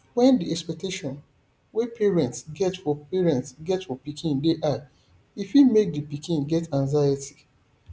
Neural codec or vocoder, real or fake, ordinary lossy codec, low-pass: none; real; none; none